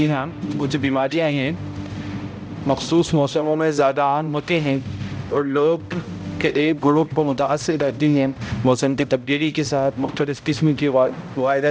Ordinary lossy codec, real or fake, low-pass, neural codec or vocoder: none; fake; none; codec, 16 kHz, 0.5 kbps, X-Codec, HuBERT features, trained on balanced general audio